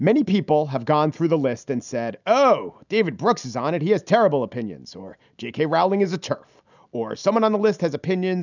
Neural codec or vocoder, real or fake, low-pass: none; real; 7.2 kHz